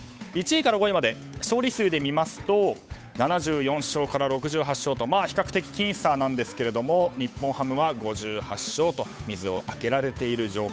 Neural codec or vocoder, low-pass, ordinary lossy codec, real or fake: codec, 16 kHz, 8 kbps, FunCodec, trained on Chinese and English, 25 frames a second; none; none; fake